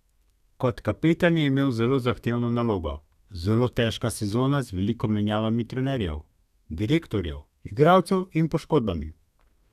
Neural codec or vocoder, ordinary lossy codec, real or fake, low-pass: codec, 32 kHz, 1.9 kbps, SNAC; none; fake; 14.4 kHz